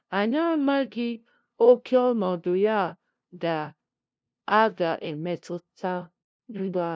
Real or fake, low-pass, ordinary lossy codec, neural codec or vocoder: fake; none; none; codec, 16 kHz, 0.5 kbps, FunCodec, trained on LibriTTS, 25 frames a second